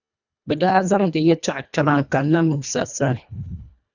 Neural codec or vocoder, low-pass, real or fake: codec, 24 kHz, 1.5 kbps, HILCodec; 7.2 kHz; fake